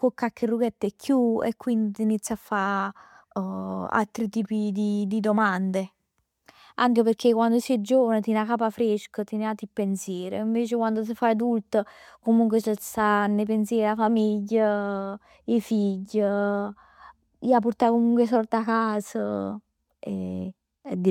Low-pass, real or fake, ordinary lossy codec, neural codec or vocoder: 19.8 kHz; real; MP3, 96 kbps; none